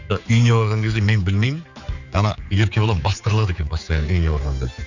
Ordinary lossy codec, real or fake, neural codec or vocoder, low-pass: none; fake; codec, 16 kHz, 4 kbps, X-Codec, HuBERT features, trained on general audio; 7.2 kHz